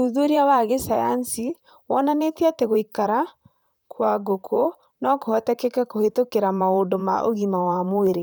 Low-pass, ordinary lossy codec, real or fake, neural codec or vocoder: none; none; fake; vocoder, 44.1 kHz, 128 mel bands, Pupu-Vocoder